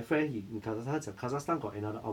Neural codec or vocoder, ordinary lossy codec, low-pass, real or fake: none; none; 19.8 kHz; real